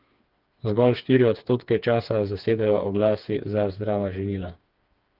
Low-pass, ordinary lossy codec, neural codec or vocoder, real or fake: 5.4 kHz; Opus, 24 kbps; codec, 16 kHz, 4 kbps, FreqCodec, smaller model; fake